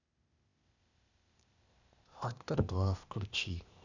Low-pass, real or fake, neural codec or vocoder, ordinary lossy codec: 7.2 kHz; fake; codec, 16 kHz, 0.8 kbps, ZipCodec; none